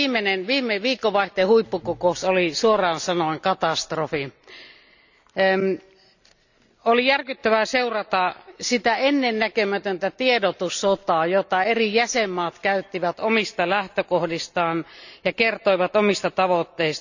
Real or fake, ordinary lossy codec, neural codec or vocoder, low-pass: real; none; none; 7.2 kHz